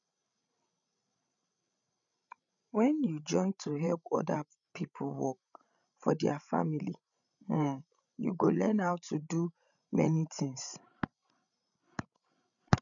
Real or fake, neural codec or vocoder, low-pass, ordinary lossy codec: fake; codec, 16 kHz, 16 kbps, FreqCodec, larger model; 7.2 kHz; none